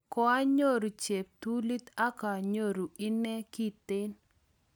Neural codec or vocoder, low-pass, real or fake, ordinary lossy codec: none; none; real; none